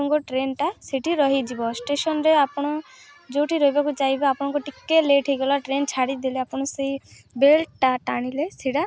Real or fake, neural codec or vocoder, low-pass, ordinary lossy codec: real; none; none; none